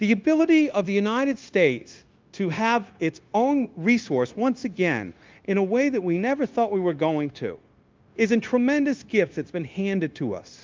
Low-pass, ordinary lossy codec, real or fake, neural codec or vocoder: 7.2 kHz; Opus, 32 kbps; fake; codec, 16 kHz, 0.9 kbps, LongCat-Audio-Codec